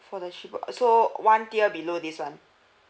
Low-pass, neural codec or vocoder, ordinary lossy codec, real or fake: none; none; none; real